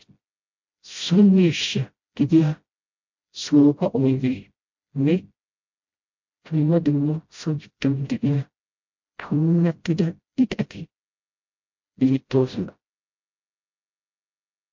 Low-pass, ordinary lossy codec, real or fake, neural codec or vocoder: 7.2 kHz; MP3, 48 kbps; fake; codec, 16 kHz, 0.5 kbps, FreqCodec, smaller model